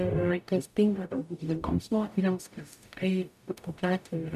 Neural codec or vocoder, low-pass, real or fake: codec, 44.1 kHz, 0.9 kbps, DAC; 14.4 kHz; fake